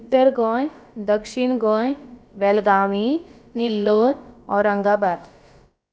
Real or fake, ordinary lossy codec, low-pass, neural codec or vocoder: fake; none; none; codec, 16 kHz, about 1 kbps, DyCAST, with the encoder's durations